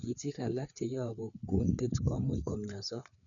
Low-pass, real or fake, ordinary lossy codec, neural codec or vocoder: 7.2 kHz; fake; none; codec, 16 kHz, 4 kbps, FreqCodec, larger model